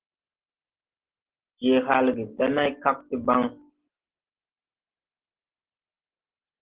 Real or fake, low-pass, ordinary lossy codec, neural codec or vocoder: real; 3.6 kHz; Opus, 16 kbps; none